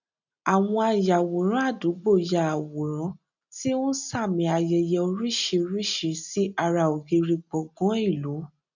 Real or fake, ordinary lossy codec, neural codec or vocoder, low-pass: real; none; none; 7.2 kHz